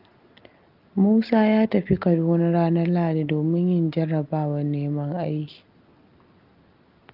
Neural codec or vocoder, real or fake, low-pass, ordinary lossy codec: none; real; 5.4 kHz; Opus, 32 kbps